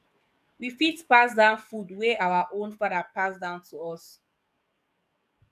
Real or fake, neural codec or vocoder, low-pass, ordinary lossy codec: fake; codec, 44.1 kHz, 7.8 kbps, DAC; 14.4 kHz; none